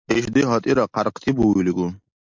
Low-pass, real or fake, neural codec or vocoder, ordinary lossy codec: 7.2 kHz; real; none; MP3, 64 kbps